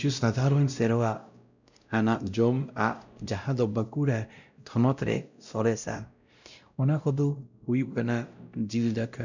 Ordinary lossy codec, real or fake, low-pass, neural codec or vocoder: none; fake; 7.2 kHz; codec, 16 kHz, 0.5 kbps, X-Codec, WavLM features, trained on Multilingual LibriSpeech